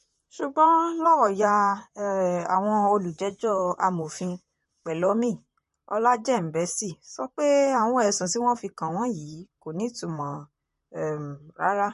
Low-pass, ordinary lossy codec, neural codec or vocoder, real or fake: 14.4 kHz; MP3, 48 kbps; vocoder, 44.1 kHz, 128 mel bands, Pupu-Vocoder; fake